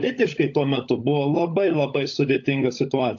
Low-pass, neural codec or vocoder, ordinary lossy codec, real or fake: 7.2 kHz; codec, 16 kHz, 16 kbps, FunCodec, trained on LibriTTS, 50 frames a second; AAC, 48 kbps; fake